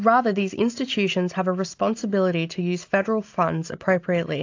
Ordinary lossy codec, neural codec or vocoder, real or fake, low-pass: AAC, 48 kbps; none; real; 7.2 kHz